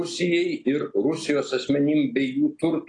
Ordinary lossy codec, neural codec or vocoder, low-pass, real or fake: AAC, 32 kbps; none; 10.8 kHz; real